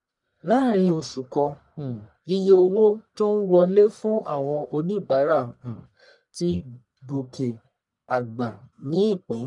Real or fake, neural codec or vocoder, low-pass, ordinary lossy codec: fake; codec, 44.1 kHz, 1.7 kbps, Pupu-Codec; 10.8 kHz; none